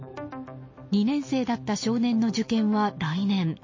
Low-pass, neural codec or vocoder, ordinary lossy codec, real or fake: 7.2 kHz; none; MP3, 32 kbps; real